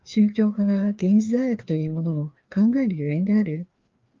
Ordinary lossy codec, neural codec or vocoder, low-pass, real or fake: Opus, 32 kbps; codec, 16 kHz, 2 kbps, FreqCodec, larger model; 7.2 kHz; fake